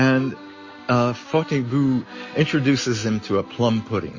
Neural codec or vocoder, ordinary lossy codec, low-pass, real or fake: none; MP3, 32 kbps; 7.2 kHz; real